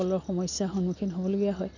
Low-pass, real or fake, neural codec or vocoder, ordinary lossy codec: 7.2 kHz; real; none; none